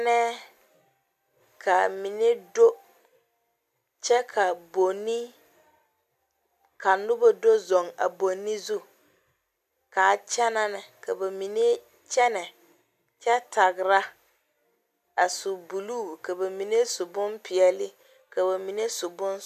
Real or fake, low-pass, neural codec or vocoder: real; 14.4 kHz; none